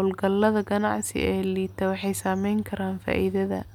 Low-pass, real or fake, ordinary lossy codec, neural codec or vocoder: 19.8 kHz; real; none; none